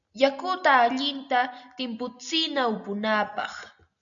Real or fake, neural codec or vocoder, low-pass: real; none; 7.2 kHz